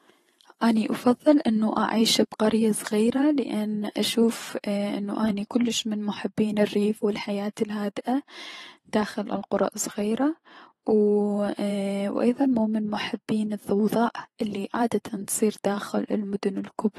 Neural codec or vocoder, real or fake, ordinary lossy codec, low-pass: vocoder, 44.1 kHz, 128 mel bands every 512 samples, BigVGAN v2; fake; AAC, 32 kbps; 19.8 kHz